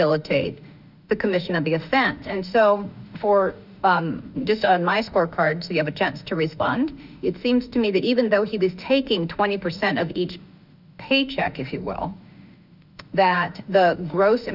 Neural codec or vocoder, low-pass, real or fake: autoencoder, 48 kHz, 32 numbers a frame, DAC-VAE, trained on Japanese speech; 5.4 kHz; fake